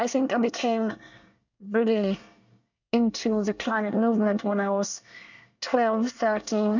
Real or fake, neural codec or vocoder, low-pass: fake; codec, 24 kHz, 1 kbps, SNAC; 7.2 kHz